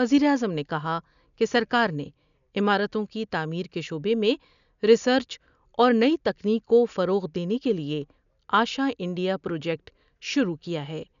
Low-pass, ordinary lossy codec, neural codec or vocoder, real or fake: 7.2 kHz; none; none; real